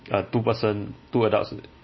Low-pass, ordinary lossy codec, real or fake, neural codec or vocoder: 7.2 kHz; MP3, 24 kbps; real; none